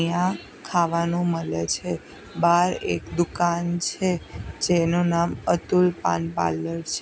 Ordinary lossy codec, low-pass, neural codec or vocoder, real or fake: none; none; none; real